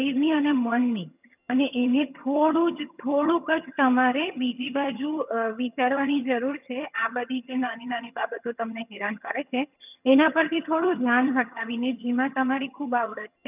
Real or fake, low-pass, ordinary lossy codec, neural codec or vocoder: fake; 3.6 kHz; none; vocoder, 22.05 kHz, 80 mel bands, HiFi-GAN